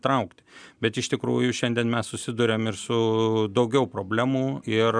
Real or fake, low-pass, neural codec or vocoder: real; 9.9 kHz; none